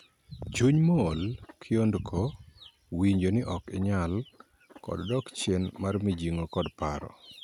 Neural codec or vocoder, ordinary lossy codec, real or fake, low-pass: none; none; real; 19.8 kHz